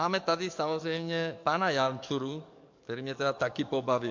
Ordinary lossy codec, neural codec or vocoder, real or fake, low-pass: MP3, 48 kbps; codec, 44.1 kHz, 7.8 kbps, DAC; fake; 7.2 kHz